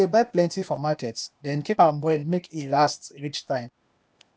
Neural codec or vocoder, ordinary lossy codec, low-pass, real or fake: codec, 16 kHz, 0.8 kbps, ZipCodec; none; none; fake